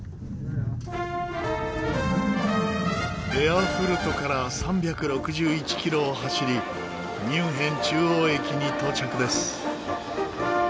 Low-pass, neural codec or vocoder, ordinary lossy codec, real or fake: none; none; none; real